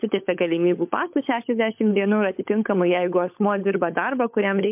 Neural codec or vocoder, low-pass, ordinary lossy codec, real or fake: codec, 16 kHz, 16 kbps, FunCodec, trained on LibriTTS, 50 frames a second; 3.6 kHz; MP3, 32 kbps; fake